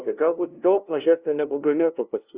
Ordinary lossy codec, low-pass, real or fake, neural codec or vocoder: Opus, 64 kbps; 3.6 kHz; fake; codec, 16 kHz, 0.5 kbps, FunCodec, trained on LibriTTS, 25 frames a second